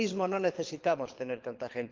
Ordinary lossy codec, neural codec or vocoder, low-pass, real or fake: Opus, 32 kbps; codec, 24 kHz, 6 kbps, HILCodec; 7.2 kHz; fake